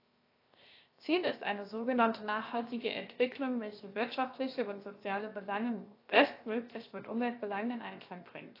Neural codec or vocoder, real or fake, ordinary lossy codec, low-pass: codec, 16 kHz, 0.7 kbps, FocalCodec; fake; AAC, 32 kbps; 5.4 kHz